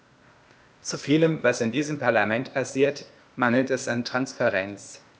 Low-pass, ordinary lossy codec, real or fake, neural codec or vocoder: none; none; fake; codec, 16 kHz, 0.8 kbps, ZipCodec